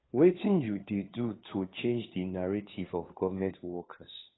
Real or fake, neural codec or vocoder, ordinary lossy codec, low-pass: fake; codec, 16 kHz, 2 kbps, FunCodec, trained on Chinese and English, 25 frames a second; AAC, 16 kbps; 7.2 kHz